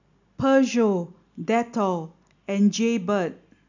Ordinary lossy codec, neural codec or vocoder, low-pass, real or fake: none; none; 7.2 kHz; real